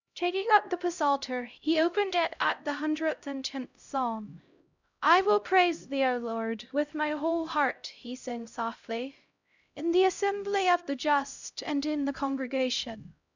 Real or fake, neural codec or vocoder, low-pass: fake; codec, 16 kHz, 0.5 kbps, X-Codec, HuBERT features, trained on LibriSpeech; 7.2 kHz